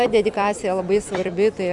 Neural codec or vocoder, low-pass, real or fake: vocoder, 44.1 kHz, 128 mel bands every 256 samples, BigVGAN v2; 10.8 kHz; fake